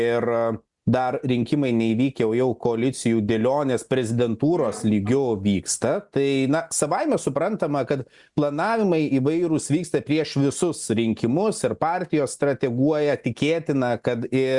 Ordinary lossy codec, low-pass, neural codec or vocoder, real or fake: Opus, 64 kbps; 10.8 kHz; none; real